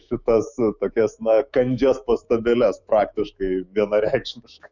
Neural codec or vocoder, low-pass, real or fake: autoencoder, 48 kHz, 128 numbers a frame, DAC-VAE, trained on Japanese speech; 7.2 kHz; fake